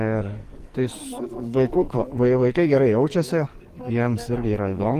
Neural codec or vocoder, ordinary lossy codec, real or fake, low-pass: codec, 44.1 kHz, 2.6 kbps, SNAC; Opus, 24 kbps; fake; 14.4 kHz